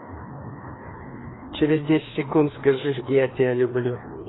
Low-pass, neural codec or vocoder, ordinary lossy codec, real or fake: 7.2 kHz; codec, 16 kHz, 2 kbps, FreqCodec, larger model; AAC, 16 kbps; fake